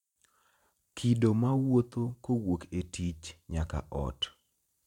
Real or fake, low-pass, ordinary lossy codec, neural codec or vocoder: fake; 19.8 kHz; none; vocoder, 44.1 kHz, 128 mel bands every 512 samples, BigVGAN v2